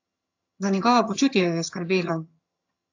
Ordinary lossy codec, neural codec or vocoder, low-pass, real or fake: AAC, 48 kbps; vocoder, 22.05 kHz, 80 mel bands, HiFi-GAN; 7.2 kHz; fake